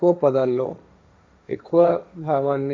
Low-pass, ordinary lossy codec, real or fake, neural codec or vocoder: none; none; fake; codec, 16 kHz, 1.1 kbps, Voila-Tokenizer